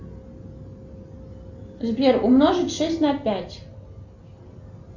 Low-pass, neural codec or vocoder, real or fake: 7.2 kHz; none; real